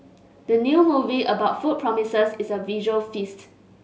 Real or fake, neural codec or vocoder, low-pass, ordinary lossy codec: real; none; none; none